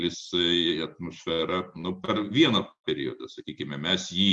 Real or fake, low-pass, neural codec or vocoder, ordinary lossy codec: real; 10.8 kHz; none; MP3, 64 kbps